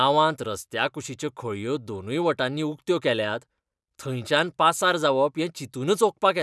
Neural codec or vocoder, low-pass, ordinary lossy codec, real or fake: none; none; none; real